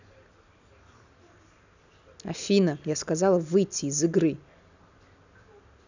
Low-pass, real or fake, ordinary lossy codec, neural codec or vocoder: 7.2 kHz; real; none; none